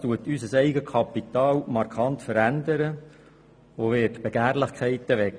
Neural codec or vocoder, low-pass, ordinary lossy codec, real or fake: none; 9.9 kHz; none; real